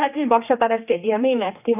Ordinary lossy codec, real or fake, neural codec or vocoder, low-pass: none; fake; codec, 16 kHz, 2 kbps, X-Codec, HuBERT features, trained on general audio; 3.6 kHz